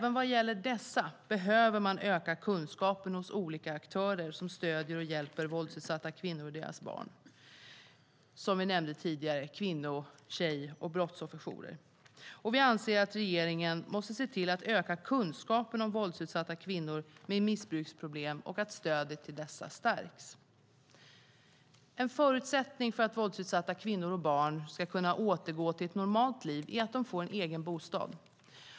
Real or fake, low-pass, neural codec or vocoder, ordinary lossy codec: real; none; none; none